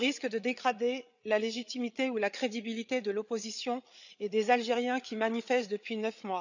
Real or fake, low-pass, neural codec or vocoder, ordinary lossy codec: fake; 7.2 kHz; codec, 16 kHz, 8 kbps, FreqCodec, larger model; none